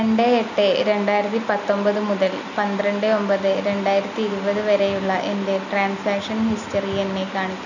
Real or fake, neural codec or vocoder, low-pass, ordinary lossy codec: real; none; 7.2 kHz; none